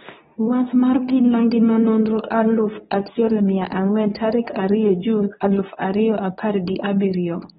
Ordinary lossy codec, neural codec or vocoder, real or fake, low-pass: AAC, 16 kbps; codec, 24 kHz, 0.9 kbps, WavTokenizer, medium speech release version 2; fake; 10.8 kHz